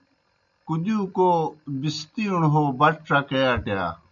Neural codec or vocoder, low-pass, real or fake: none; 7.2 kHz; real